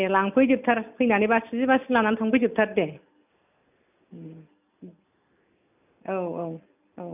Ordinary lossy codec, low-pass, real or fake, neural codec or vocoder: none; 3.6 kHz; real; none